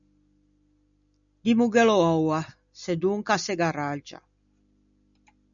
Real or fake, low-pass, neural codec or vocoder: real; 7.2 kHz; none